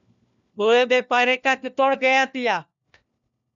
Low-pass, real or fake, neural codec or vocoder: 7.2 kHz; fake; codec, 16 kHz, 1 kbps, FunCodec, trained on LibriTTS, 50 frames a second